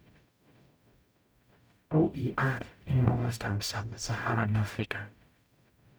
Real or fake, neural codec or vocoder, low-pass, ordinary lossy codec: fake; codec, 44.1 kHz, 0.9 kbps, DAC; none; none